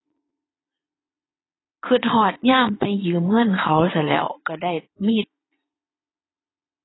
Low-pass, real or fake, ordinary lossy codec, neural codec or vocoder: 7.2 kHz; fake; AAC, 16 kbps; vocoder, 22.05 kHz, 80 mel bands, Vocos